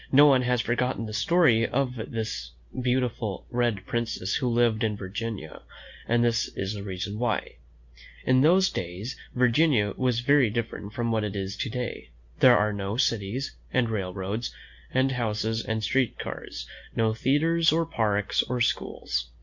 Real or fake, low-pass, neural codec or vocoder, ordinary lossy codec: real; 7.2 kHz; none; Opus, 64 kbps